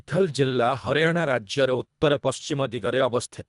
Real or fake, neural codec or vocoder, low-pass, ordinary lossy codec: fake; codec, 24 kHz, 1.5 kbps, HILCodec; 10.8 kHz; MP3, 96 kbps